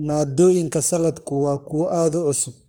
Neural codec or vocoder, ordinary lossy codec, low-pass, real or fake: codec, 44.1 kHz, 3.4 kbps, Pupu-Codec; none; none; fake